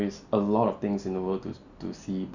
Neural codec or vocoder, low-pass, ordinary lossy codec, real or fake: none; 7.2 kHz; none; real